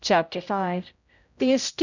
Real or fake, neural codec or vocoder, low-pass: fake; codec, 16 kHz, 0.5 kbps, X-Codec, HuBERT features, trained on general audio; 7.2 kHz